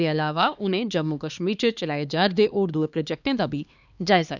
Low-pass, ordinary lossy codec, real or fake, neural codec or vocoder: 7.2 kHz; none; fake; codec, 16 kHz, 2 kbps, X-Codec, HuBERT features, trained on LibriSpeech